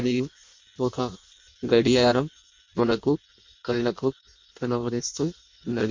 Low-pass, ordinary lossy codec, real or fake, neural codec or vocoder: 7.2 kHz; MP3, 48 kbps; fake; codec, 16 kHz in and 24 kHz out, 0.6 kbps, FireRedTTS-2 codec